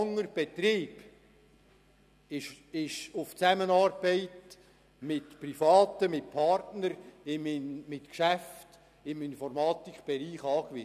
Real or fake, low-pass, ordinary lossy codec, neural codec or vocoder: real; 14.4 kHz; none; none